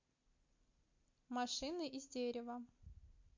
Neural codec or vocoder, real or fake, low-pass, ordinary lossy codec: none; real; 7.2 kHz; MP3, 48 kbps